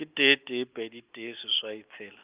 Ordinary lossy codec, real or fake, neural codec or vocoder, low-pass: Opus, 32 kbps; real; none; 3.6 kHz